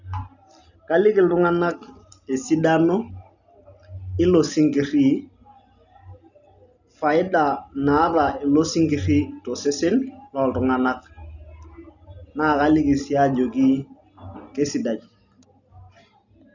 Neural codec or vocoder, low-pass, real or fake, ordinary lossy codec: none; 7.2 kHz; real; none